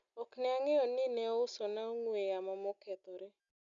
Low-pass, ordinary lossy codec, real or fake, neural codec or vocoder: 7.2 kHz; none; real; none